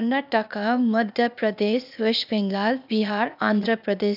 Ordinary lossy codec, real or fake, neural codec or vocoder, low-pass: none; fake; codec, 16 kHz, 0.8 kbps, ZipCodec; 5.4 kHz